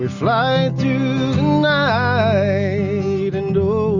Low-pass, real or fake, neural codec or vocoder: 7.2 kHz; real; none